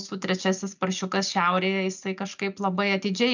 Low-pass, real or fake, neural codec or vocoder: 7.2 kHz; real; none